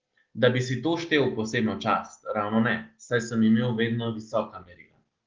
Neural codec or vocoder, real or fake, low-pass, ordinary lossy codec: none; real; 7.2 kHz; Opus, 16 kbps